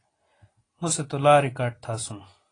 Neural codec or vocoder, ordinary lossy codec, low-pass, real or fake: none; AAC, 32 kbps; 9.9 kHz; real